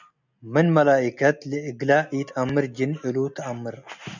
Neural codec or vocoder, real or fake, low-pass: none; real; 7.2 kHz